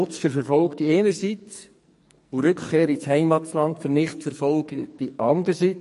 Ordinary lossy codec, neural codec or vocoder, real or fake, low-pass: MP3, 48 kbps; codec, 44.1 kHz, 2.6 kbps, SNAC; fake; 14.4 kHz